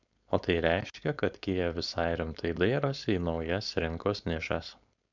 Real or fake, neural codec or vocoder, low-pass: fake; codec, 16 kHz, 4.8 kbps, FACodec; 7.2 kHz